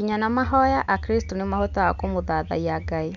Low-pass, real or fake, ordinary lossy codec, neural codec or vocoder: 7.2 kHz; real; none; none